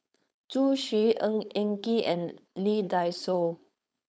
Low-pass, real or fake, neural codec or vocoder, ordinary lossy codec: none; fake; codec, 16 kHz, 4.8 kbps, FACodec; none